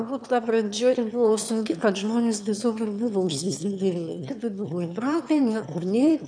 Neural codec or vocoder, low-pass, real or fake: autoencoder, 22.05 kHz, a latent of 192 numbers a frame, VITS, trained on one speaker; 9.9 kHz; fake